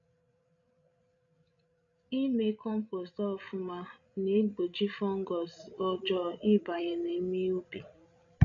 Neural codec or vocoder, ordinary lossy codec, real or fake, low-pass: codec, 16 kHz, 16 kbps, FreqCodec, larger model; none; fake; 7.2 kHz